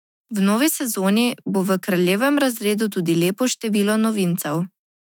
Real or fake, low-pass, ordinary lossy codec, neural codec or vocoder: fake; 19.8 kHz; none; autoencoder, 48 kHz, 128 numbers a frame, DAC-VAE, trained on Japanese speech